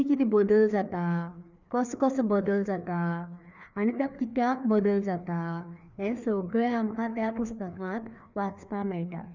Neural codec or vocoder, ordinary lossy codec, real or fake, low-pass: codec, 16 kHz, 2 kbps, FreqCodec, larger model; none; fake; 7.2 kHz